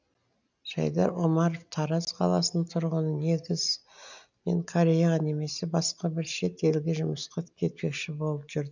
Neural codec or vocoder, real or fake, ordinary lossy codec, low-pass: none; real; none; 7.2 kHz